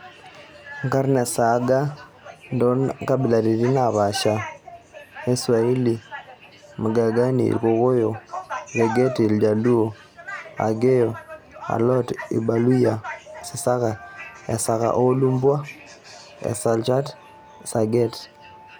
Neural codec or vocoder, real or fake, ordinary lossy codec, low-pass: none; real; none; none